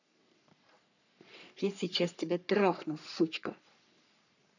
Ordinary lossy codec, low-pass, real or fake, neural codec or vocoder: none; 7.2 kHz; fake; codec, 44.1 kHz, 3.4 kbps, Pupu-Codec